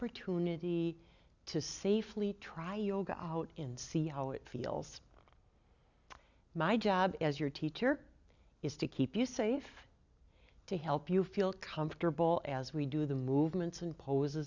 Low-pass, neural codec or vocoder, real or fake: 7.2 kHz; none; real